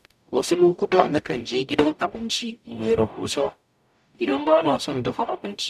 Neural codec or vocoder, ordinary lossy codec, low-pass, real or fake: codec, 44.1 kHz, 0.9 kbps, DAC; none; 14.4 kHz; fake